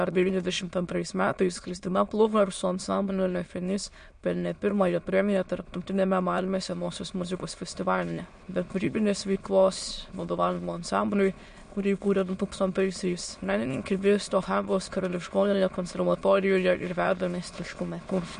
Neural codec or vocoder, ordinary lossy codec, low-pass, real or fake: autoencoder, 22.05 kHz, a latent of 192 numbers a frame, VITS, trained on many speakers; MP3, 48 kbps; 9.9 kHz; fake